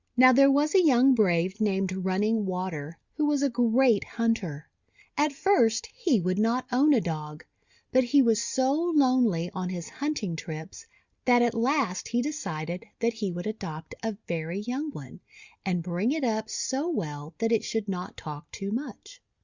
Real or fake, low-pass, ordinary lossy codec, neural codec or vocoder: real; 7.2 kHz; Opus, 64 kbps; none